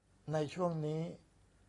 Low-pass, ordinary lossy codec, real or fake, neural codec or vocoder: 10.8 kHz; AAC, 32 kbps; real; none